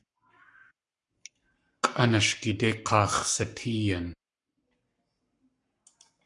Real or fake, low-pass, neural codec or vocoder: fake; 10.8 kHz; codec, 44.1 kHz, 7.8 kbps, Pupu-Codec